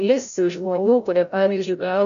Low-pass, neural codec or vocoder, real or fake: 7.2 kHz; codec, 16 kHz, 0.5 kbps, FreqCodec, larger model; fake